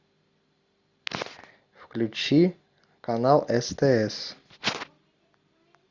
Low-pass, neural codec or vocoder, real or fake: 7.2 kHz; none; real